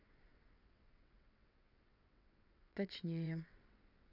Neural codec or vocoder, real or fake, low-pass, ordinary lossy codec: vocoder, 22.05 kHz, 80 mel bands, WaveNeXt; fake; 5.4 kHz; none